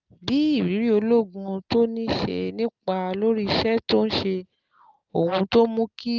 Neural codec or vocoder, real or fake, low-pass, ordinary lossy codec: none; real; 7.2 kHz; Opus, 32 kbps